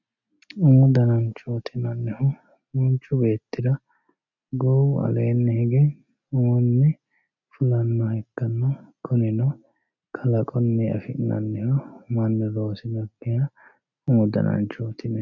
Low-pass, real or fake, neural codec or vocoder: 7.2 kHz; real; none